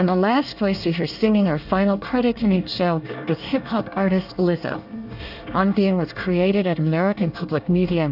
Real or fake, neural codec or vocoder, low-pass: fake; codec, 24 kHz, 1 kbps, SNAC; 5.4 kHz